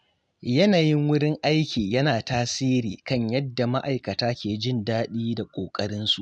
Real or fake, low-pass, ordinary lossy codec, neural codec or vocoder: real; none; none; none